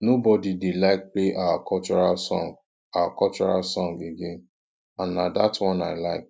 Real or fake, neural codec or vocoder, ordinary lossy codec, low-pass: real; none; none; none